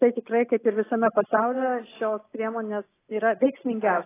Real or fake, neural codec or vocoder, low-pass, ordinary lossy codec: real; none; 3.6 kHz; AAC, 16 kbps